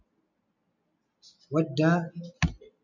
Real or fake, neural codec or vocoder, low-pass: real; none; 7.2 kHz